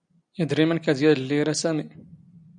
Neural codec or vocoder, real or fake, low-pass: none; real; 9.9 kHz